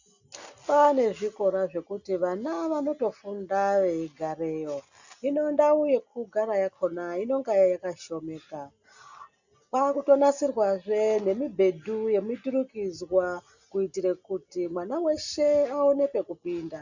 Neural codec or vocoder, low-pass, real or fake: none; 7.2 kHz; real